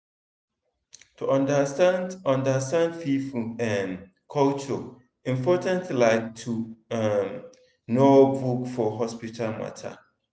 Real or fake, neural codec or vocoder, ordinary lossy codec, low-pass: real; none; none; none